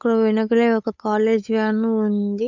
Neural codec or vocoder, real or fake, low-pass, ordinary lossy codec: codec, 16 kHz, 8 kbps, FunCodec, trained on Chinese and English, 25 frames a second; fake; 7.2 kHz; none